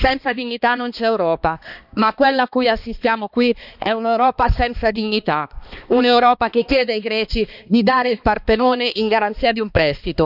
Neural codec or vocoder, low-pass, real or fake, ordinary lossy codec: codec, 16 kHz, 2 kbps, X-Codec, HuBERT features, trained on balanced general audio; 5.4 kHz; fake; none